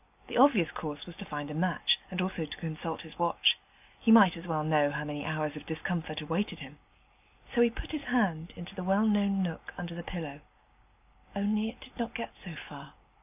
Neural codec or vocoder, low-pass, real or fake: none; 3.6 kHz; real